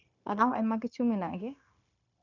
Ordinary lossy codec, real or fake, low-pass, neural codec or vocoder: none; fake; 7.2 kHz; codec, 16 kHz, 0.9 kbps, LongCat-Audio-Codec